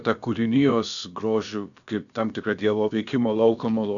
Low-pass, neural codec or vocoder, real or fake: 7.2 kHz; codec, 16 kHz, about 1 kbps, DyCAST, with the encoder's durations; fake